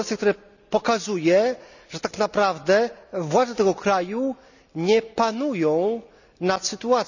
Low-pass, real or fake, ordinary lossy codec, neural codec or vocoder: 7.2 kHz; real; none; none